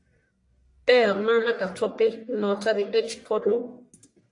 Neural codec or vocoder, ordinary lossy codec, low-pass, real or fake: codec, 44.1 kHz, 1.7 kbps, Pupu-Codec; AAC, 48 kbps; 10.8 kHz; fake